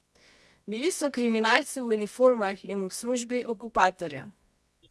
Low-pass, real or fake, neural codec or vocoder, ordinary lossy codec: none; fake; codec, 24 kHz, 0.9 kbps, WavTokenizer, medium music audio release; none